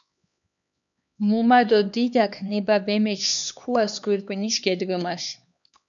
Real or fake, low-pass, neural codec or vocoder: fake; 7.2 kHz; codec, 16 kHz, 2 kbps, X-Codec, HuBERT features, trained on LibriSpeech